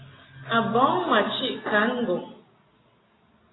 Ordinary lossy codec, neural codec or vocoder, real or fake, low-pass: AAC, 16 kbps; none; real; 7.2 kHz